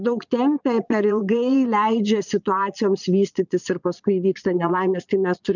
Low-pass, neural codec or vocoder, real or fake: 7.2 kHz; vocoder, 22.05 kHz, 80 mel bands, Vocos; fake